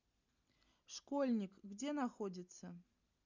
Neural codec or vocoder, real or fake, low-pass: none; real; 7.2 kHz